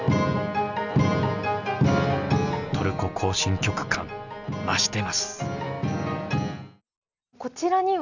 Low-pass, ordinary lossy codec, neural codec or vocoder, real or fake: 7.2 kHz; none; none; real